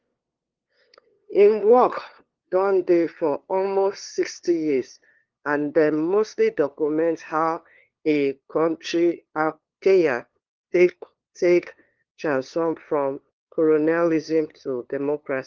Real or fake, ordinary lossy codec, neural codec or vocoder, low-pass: fake; Opus, 16 kbps; codec, 16 kHz, 2 kbps, FunCodec, trained on LibriTTS, 25 frames a second; 7.2 kHz